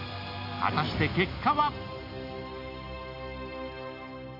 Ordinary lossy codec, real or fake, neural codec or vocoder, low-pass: AAC, 48 kbps; real; none; 5.4 kHz